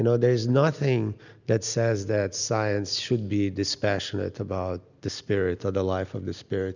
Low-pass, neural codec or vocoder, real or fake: 7.2 kHz; none; real